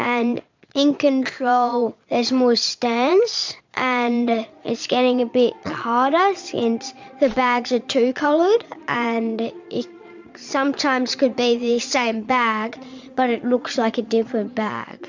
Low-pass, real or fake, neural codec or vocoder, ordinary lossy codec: 7.2 kHz; fake; vocoder, 22.05 kHz, 80 mel bands, Vocos; MP3, 64 kbps